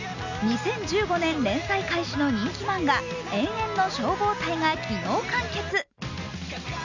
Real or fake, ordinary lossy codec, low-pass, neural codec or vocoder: real; none; 7.2 kHz; none